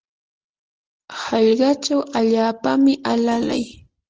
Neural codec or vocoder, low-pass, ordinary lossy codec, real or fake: none; 7.2 kHz; Opus, 16 kbps; real